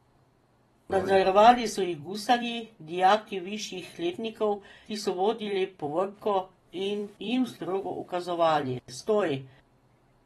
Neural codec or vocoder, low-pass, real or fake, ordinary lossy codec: vocoder, 44.1 kHz, 128 mel bands every 256 samples, BigVGAN v2; 19.8 kHz; fake; AAC, 32 kbps